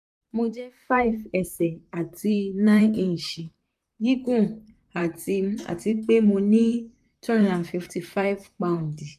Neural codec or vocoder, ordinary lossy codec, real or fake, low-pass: vocoder, 44.1 kHz, 128 mel bands, Pupu-Vocoder; none; fake; 14.4 kHz